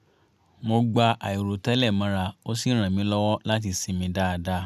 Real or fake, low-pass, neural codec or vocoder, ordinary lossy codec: real; 14.4 kHz; none; none